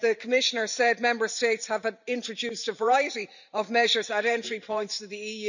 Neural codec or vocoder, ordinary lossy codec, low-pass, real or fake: vocoder, 44.1 kHz, 128 mel bands every 512 samples, BigVGAN v2; none; 7.2 kHz; fake